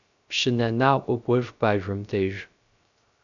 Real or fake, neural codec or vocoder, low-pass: fake; codec, 16 kHz, 0.3 kbps, FocalCodec; 7.2 kHz